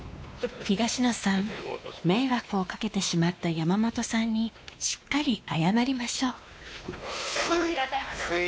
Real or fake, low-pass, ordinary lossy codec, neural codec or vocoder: fake; none; none; codec, 16 kHz, 2 kbps, X-Codec, WavLM features, trained on Multilingual LibriSpeech